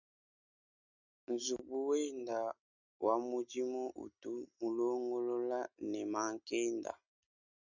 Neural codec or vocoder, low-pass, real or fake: none; 7.2 kHz; real